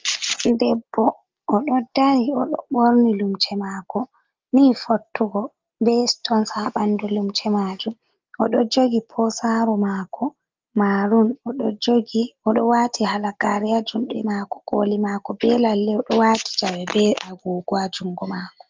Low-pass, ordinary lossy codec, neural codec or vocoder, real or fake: 7.2 kHz; Opus, 32 kbps; none; real